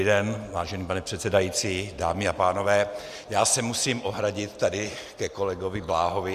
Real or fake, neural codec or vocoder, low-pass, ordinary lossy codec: real; none; 14.4 kHz; Opus, 64 kbps